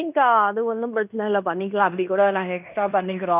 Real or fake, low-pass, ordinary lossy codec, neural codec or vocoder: fake; 3.6 kHz; none; codec, 16 kHz in and 24 kHz out, 0.9 kbps, LongCat-Audio-Codec, fine tuned four codebook decoder